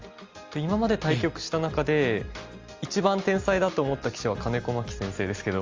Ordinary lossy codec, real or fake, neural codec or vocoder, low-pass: Opus, 32 kbps; real; none; 7.2 kHz